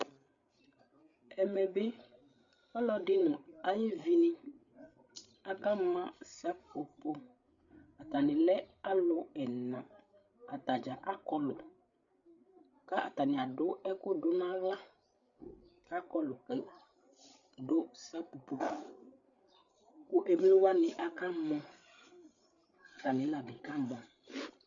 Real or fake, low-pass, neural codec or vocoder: fake; 7.2 kHz; codec, 16 kHz, 8 kbps, FreqCodec, larger model